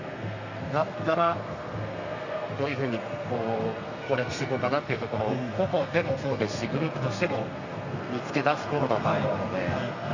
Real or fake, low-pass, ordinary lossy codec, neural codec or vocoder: fake; 7.2 kHz; Opus, 64 kbps; codec, 32 kHz, 1.9 kbps, SNAC